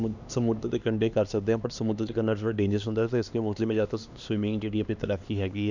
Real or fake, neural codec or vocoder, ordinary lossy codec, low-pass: fake; codec, 16 kHz, 2 kbps, X-Codec, HuBERT features, trained on LibriSpeech; none; 7.2 kHz